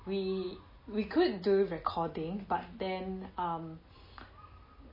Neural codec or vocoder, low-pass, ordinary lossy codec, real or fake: none; 5.4 kHz; MP3, 24 kbps; real